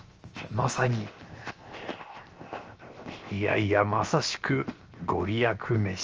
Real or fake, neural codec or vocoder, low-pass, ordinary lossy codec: fake; codec, 16 kHz, 0.7 kbps, FocalCodec; 7.2 kHz; Opus, 24 kbps